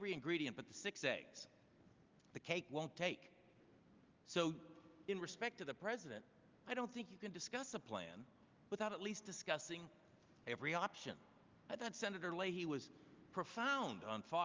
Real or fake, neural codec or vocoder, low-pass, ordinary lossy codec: real; none; 7.2 kHz; Opus, 32 kbps